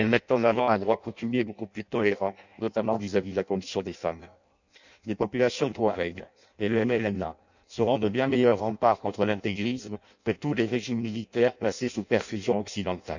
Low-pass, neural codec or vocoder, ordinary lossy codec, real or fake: 7.2 kHz; codec, 16 kHz in and 24 kHz out, 0.6 kbps, FireRedTTS-2 codec; none; fake